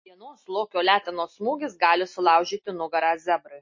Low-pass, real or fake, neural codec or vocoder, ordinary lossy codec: 7.2 kHz; real; none; MP3, 32 kbps